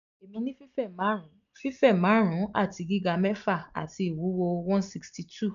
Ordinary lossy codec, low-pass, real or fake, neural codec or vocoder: none; 7.2 kHz; real; none